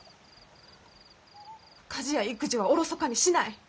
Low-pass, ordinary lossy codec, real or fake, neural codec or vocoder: none; none; real; none